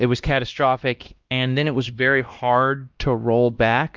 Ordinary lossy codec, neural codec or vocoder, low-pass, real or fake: Opus, 24 kbps; codec, 16 kHz, 1 kbps, X-Codec, WavLM features, trained on Multilingual LibriSpeech; 7.2 kHz; fake